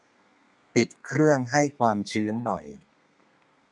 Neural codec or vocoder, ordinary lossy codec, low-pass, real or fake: codec, 32 kHz, 1.9 kbps, SNAC; none; 10.8 kHz; fake